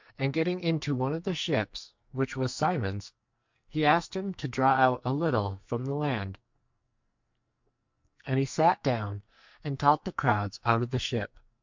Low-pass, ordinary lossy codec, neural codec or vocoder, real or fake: 7.2 kHz; MP3, 64 kbps; codec, 44.1 kHz, 2.6 kbps, SNAC; fake